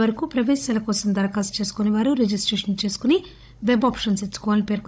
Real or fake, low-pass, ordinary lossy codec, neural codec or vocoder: fake; none; none; codec, 16 kHz, 16 kbps, FunCodec, trained on Chinese and English, 50 frames a second